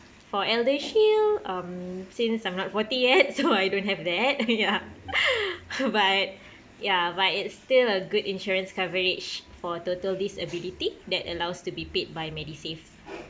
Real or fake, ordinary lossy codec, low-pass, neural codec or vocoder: real; none; none; none